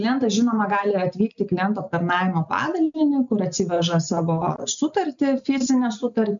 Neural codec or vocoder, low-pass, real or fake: none; 7.2 kHz; real